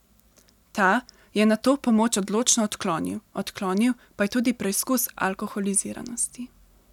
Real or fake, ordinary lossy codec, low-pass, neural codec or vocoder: real; none; 19.8 kHz; none